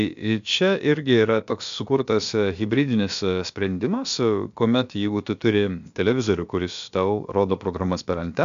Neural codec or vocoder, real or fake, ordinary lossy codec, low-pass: codec, 16 kHz, about 1 kbps, DyCAST, with the encoder's durations; fake; MP3, 64 kbps; 7.2 kHz